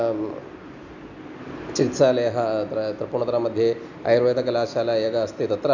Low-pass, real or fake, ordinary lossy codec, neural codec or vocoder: 7.2 kHz; real; none; none